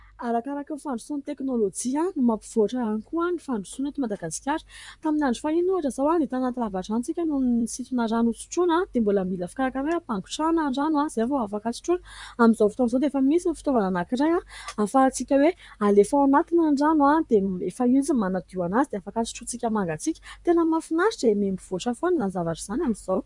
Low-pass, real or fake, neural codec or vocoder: 10.8 kHz; fake; vocoder, 44.1 kHz, 128 mel bands, Pupu-Vocoder